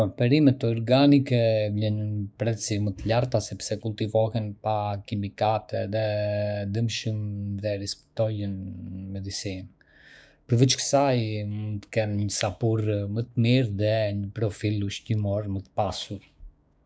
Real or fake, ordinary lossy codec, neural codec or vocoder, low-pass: fake; none; codec, 16 kHz, 6 kbps, DAC; none